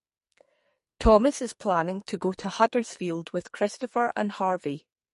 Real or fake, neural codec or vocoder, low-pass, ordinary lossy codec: fake; codec, 44.1 kHz, 2.6 kbps, SNAC; 14.4 kHz; MP3, 48 kbps